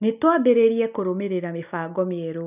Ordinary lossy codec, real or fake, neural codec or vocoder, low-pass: none; real; none; 3.6 kHz